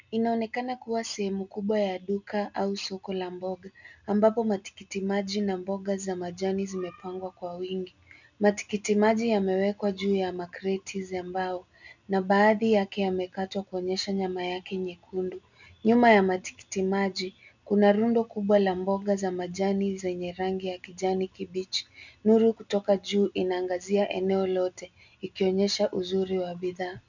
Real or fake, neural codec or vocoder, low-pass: real; none; 7.2 kHz